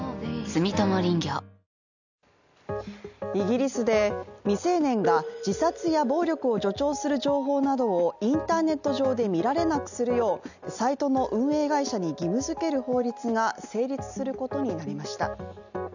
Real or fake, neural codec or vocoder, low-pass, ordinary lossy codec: real; none; 7.2 kHz; none